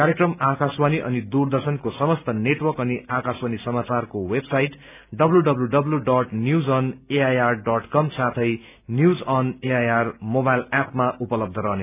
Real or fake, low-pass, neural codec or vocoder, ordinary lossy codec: real; 3.6 kHz; none; none